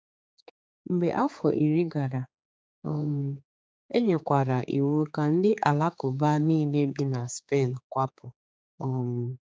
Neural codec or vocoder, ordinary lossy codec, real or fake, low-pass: codec, 16 kHz, 4 kbps, X-Codec, HuBERT features, trained on general audio; none; fake; none